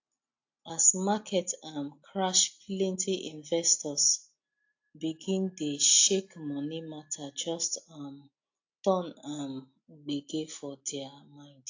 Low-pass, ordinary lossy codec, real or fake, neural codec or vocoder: 7.2 kHz; AAC, 48 kbps; real; none